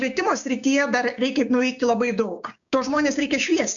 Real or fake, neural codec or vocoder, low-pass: fake; codec, 16 kHz, 6 kbps, DAC; 7.2 kHz